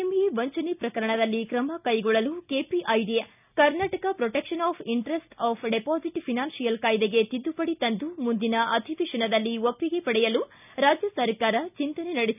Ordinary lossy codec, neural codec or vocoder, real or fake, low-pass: none; none; real; 3.6 kHz